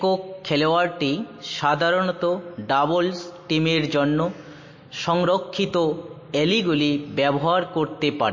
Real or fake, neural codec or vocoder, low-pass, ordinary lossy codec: real; none; 7.2 kHz; MP3, 32 kbps